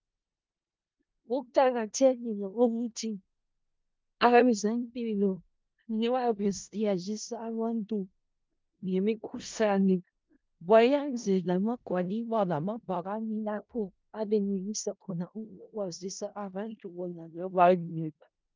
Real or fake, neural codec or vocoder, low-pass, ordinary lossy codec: fake; codec, 16 kHz in and 24 kHz out, 0.4 kbps, LongCat-Audio-Codec, four codebook decoder; 7.2 kHz; Opus, 32 kbps